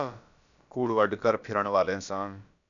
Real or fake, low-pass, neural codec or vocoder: fake; 7.2 kHz; codec, 16 kHz, about 1 kbps, DyCAST, with the encoder's durations